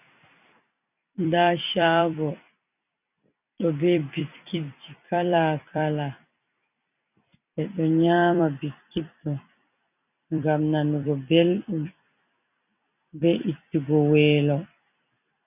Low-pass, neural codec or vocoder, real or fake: 3.6 kHz; vocoder, 44.1 kHz, 128 mel bands every 256 samples, BigVGAN v2; fake